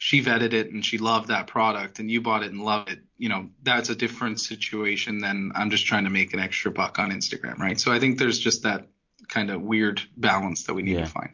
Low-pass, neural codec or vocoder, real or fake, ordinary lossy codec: 7.2 kHz; none; real; MP3, 48 kbps